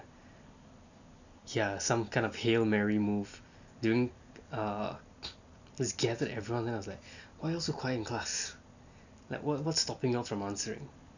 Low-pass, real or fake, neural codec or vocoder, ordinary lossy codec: 7.2 kHz; real; none; none